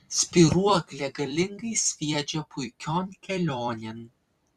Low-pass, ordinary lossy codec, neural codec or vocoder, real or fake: 14.4 kHz; Opus, 64 kbps; vocoder, 44.1 kHz, 128 mel bands every 256 samples, BigVGAN v2; fake